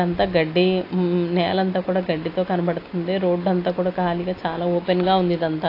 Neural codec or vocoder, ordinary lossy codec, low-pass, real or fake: none; AAC, 32 kbps; 5.4 kHz; real